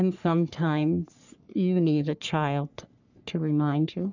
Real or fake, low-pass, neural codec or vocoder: fake; 7.2 kHz; codec, 44.1 kHz, 3.4 kbps, Pupu-Codec